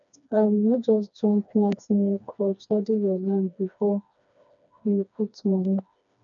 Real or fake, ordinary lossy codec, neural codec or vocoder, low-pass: fake; none; codec, 16 kHz, 2 kbps, FreqCodec, smaller model; 7.2 kHz